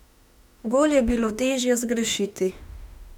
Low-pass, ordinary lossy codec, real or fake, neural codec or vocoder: 19.8 kHz; none; fake; autoencoder, 48 kHz, 32 numbers a frame, DAC-VAE, trained on Japanese speech